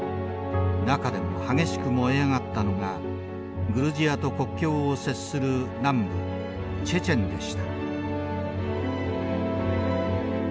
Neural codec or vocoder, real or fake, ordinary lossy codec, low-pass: none; real; none; none